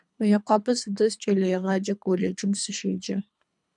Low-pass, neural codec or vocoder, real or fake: 10.8 kHz; codec, 24 kHz, 3 kbps, HILCodec; fake